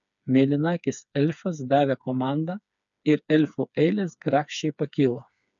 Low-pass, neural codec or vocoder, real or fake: 7.2 kHz; codec, 16 kHz, 4 kbps, FreqCodec, smaller model; fake